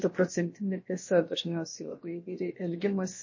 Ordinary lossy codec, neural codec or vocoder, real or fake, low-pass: MP3, 32 kbps; codec, 16 kHz, about 1 kbps, DyCAST, with the encoder's durations; fake; 7.2 kHz